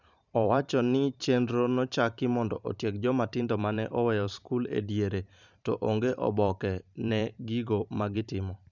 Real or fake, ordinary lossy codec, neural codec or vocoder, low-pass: fake; none; vocoder, 44.1 kHz, 128 mel bands every 512 samples, BigVGAN v2; 7.2 kHz